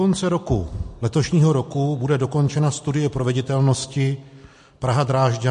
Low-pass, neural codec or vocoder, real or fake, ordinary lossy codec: 14.4 kHz; none; real; MP3, 48 kbps